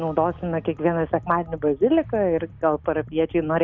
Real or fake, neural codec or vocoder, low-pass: real; none; 7.2 kHz